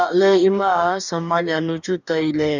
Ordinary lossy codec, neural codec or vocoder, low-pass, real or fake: none; codec, 44.1 kHz, 2.6 kbps, DAC; 7.2 kHz; fake